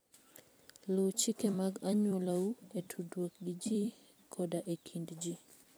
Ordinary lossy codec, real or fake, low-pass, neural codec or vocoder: none; fake; none; vocoder, 44.1 kHz, 128 mel bands every 256 samples, BigVGAN v2